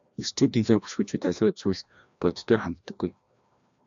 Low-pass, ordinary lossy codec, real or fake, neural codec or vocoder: 7.2 kHz; AAC, 64 kbps; fake; codec, 16 kHz, 1 kbps, FreqCodec, larger model